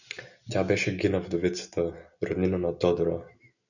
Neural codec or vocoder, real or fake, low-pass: none; real; 7.2 kHz